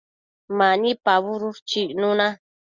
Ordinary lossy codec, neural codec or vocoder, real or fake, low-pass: Opus, 64 kbps; none; real; 7.2 kHz